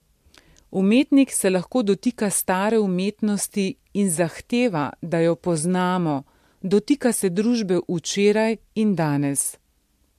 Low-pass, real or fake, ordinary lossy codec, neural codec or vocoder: 14.4 kHz; real; MP3, 64 kbps; none